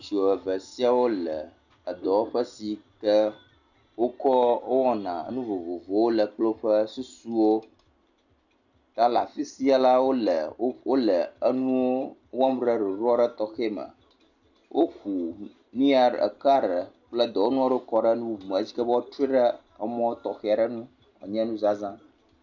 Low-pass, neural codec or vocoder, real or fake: 7.2 kHz; none; real